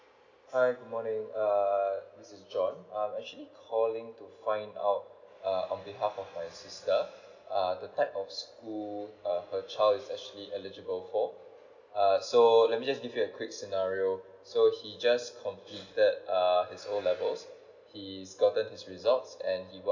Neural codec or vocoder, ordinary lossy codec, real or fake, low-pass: none; none; real; 7.2 kHz